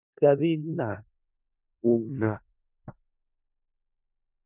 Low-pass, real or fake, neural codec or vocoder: 3.6 kHz; fake; codec, 16 kHz in and 24 kHz out, 0.4 kbps, LongCat-Audio-Codec, four codebook decoder